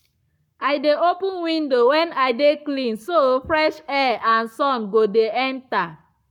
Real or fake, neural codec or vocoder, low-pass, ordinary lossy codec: fake; codec, 44.1 kHz, 7.8 kbps, Pupu-Codec; 19.8 kHz; none